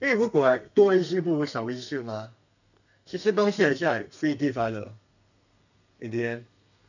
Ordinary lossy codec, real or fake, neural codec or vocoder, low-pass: none; fake; codec, 32 kHz, 1.9 kbps, SNAC; 7.2 kHz